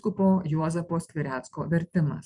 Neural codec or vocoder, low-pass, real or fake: vocoder, 44.1 kHz, 128 mel bands every 512 samples, BigVGAN v2; 10.8 kHz; fake